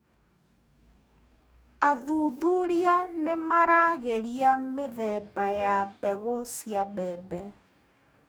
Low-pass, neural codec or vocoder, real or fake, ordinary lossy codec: none; codec, 44.1 kHz, 2.6 kbps, DAC; fake; none